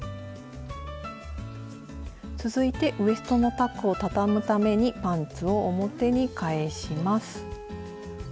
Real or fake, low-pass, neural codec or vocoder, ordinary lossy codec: real; none; none; none